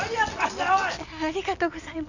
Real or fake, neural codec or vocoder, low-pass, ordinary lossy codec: real; none; 7.2 kHz; none